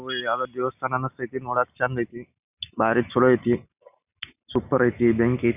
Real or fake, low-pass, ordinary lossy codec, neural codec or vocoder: real; 3.6 kHz; none; none